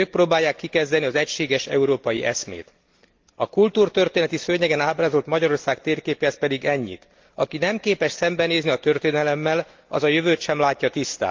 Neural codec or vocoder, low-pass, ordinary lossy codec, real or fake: none; 7.2 kHz; Opus, 32 kbps; real